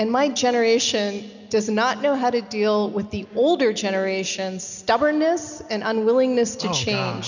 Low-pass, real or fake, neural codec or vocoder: 7.2 kHz; real; none